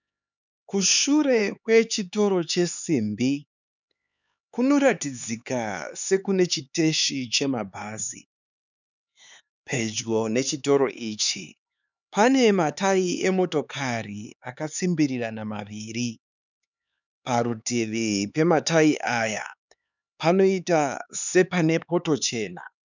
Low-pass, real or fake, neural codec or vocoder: 7.2 kHz; fake; codec, 16 kHz, 4 kbps, X-Codec, HuBERT features, trained on LibriSpeech